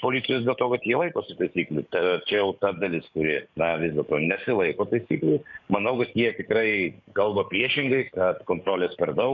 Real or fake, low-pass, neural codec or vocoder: fake; 7.2 kHz; codec, 44.1 kHz, 7.8 kbps, DAC